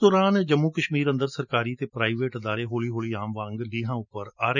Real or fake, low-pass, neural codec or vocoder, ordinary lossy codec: real; 7.2 kHz; none; none